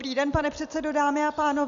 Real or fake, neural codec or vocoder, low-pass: real; none; 7.2 kHz